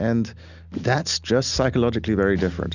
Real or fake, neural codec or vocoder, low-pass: real; none; 7.2 kHz